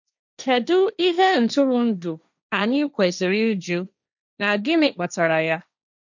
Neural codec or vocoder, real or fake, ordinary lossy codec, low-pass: codec, 16 kHz, 1.1 kbps, Voila-Tokenizer; fake; none; 7.2 kHz